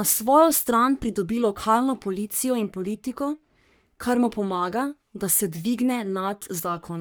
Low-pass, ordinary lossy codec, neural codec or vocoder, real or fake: none; none; codec, 44.1 kHz, 3.4 kbps, Pupu-Codec; fake